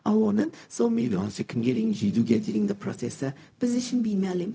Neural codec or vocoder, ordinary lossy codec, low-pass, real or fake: codec, 16 kHz, 0.4 kbps, LongCat-Audio-Codec; none; none; fake